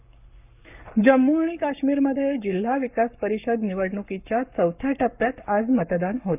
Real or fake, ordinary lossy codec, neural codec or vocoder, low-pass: fake; none; codec, 44.1 kHz, 7.8 kbps, Pupu-Codec; 3.6 kHz